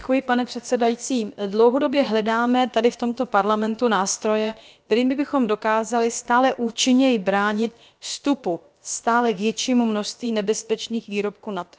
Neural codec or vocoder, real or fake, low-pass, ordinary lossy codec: codec, 16 kHz, about 1 kbps, DyCAST, with the encoder's durations; fake; none; none